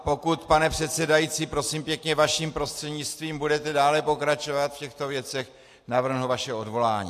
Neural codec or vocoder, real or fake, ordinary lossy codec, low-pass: none; real; AAC, 64 kbps; 14.4 kHz